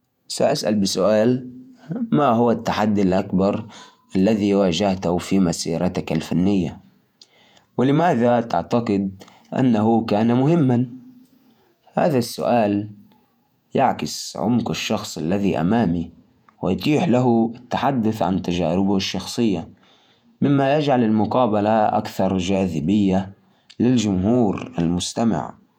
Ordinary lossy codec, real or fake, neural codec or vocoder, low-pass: none; fake; vocoder, 48 kHz, 128 mel bands, Vocos; 19.8 kHz